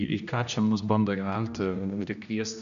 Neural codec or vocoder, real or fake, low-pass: codec, 16 kHz, 1 kbps, X-Codec, HuBERT features, trained on general audio; fake; 7.2 kHz